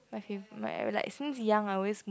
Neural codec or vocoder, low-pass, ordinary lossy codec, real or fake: none; none; none; real